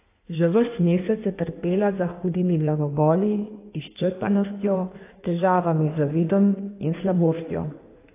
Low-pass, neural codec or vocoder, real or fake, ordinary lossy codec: 3.6 kHz; codec, 16 kHz in and 24 kHz out, 1.1 kbps, FireRedTTS-2 codec; fake; AAC, 24 kbps